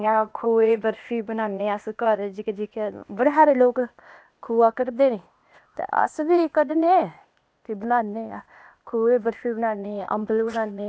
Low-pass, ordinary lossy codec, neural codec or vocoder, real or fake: none; none; codec, 16 kHz, 0.8 kbps, ZipCodec; fake